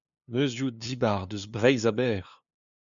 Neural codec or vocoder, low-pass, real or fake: codec, 16 kHz, 2 kbps, FunCodec, trained on LibriTTS, 25 frames a second; 7.2 kHz; fake